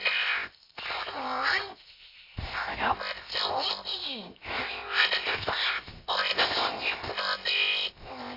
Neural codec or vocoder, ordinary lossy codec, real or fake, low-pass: codec, 16 kHz, 0.7 kbps, FocalCodec; MP3, 32 kbps; fake; 5.4 kHz